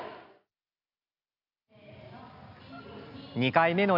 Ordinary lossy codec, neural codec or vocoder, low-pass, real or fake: none; none; 5.4 kHz; real